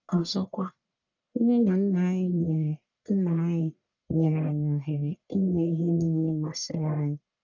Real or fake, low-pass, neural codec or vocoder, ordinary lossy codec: fake; 7.2 kHz; codec, 44.1 kHz, 1.7 kbps, Pupu-Codec; none